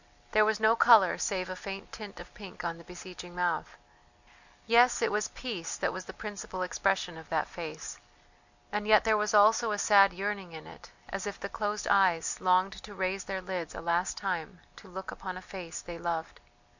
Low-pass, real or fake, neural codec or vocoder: 7.2 kHz; real; none